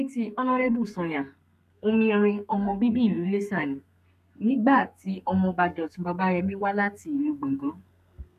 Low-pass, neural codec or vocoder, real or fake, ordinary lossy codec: 14.4 kHz; codec, 32 kHz, 1.9 kbps, SNAC; fake; none